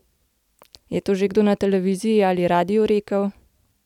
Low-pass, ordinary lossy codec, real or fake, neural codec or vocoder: 19.8 kHz; none; real; none